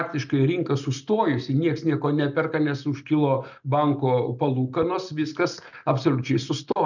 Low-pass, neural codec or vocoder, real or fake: 7.2 kHz; none; real